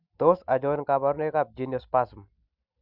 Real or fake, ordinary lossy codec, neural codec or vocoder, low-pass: real; Opus, 64 kbps; none; 5.4 kHz